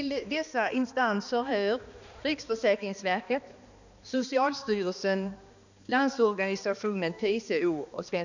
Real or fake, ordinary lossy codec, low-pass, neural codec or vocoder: fake; none; 7.2 kHz; codec, 16 kHz, 2 kbps, X-Codec, HuBERT features, trained on balanced general audio